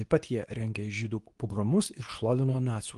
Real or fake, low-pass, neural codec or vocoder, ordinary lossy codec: fake; 10.8 kHz; codec, 24 kHz, 0.9 kbps, WavTokenizer, medium speech release version 2; Opus, 24 kbps